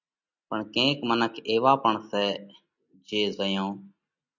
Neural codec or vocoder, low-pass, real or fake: none; 7.2 kHz; real